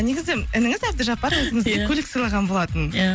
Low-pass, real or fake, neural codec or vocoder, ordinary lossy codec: none; real; none; none